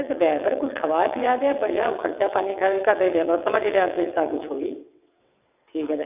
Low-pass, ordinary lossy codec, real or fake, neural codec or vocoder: 3.6 kHz; none; fake; vocoder, 22.05 kHz, 80 mel bands, WaveNeXt